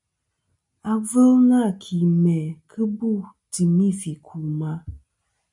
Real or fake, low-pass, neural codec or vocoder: real; 10.8 kHz; none